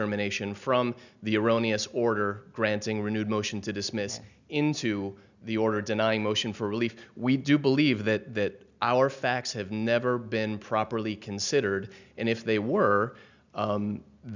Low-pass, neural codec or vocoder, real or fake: 7.2 kHz; none; real